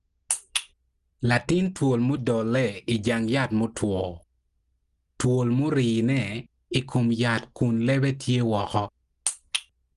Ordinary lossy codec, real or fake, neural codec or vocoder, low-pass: Opus, 24 kbps; real; none; 10.8 kHz